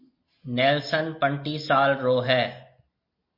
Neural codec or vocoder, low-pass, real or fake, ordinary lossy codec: none; 5.4 kHz; real; MP3, 32 kbps